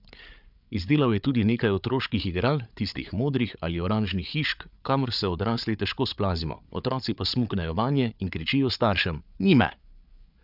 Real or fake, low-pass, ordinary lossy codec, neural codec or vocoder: fake; 5.4 kHz; none; codec, 16 kHz, 8 kbps, FreqCodec, larger model